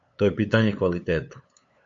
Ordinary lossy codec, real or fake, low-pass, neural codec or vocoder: AAC, 64 kbps; fake; 7.2 kHz; codec, 16 kHz, 8 kbps, FunCodec, trained on LibriTTS, 25 frames a second